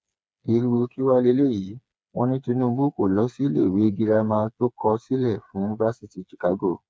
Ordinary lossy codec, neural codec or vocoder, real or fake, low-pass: none; codec, 16 kHz, 4 kbps, FreqCodec, smaller model; fake; none